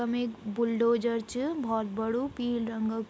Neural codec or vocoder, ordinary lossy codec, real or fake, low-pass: none; none; real; none